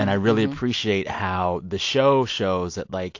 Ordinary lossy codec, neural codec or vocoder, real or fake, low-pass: AAC, 48 kbps; none; real; 7.2 kHz